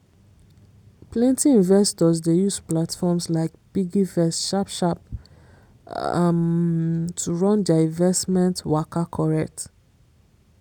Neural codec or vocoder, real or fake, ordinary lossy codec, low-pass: none; real; none; none